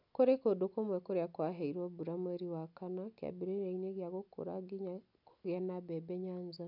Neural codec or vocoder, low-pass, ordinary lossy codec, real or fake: none; 5.4 kHz; none; real